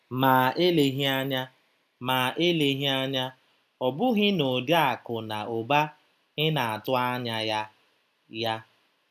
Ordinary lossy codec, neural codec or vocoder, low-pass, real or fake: none; none; 14.4 kHz; real